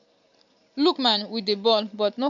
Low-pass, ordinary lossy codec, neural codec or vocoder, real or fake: 7.2 kHz; AAC, 64 kbps; none; real